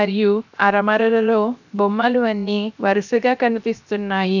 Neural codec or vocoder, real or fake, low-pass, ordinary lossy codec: codec, 16 kHz, 0.7 kbps, FocalCodec; fake; 7.2 kHz; none